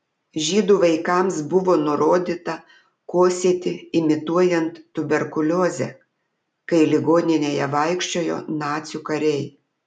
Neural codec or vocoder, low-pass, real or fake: none; 9.9 kHz; real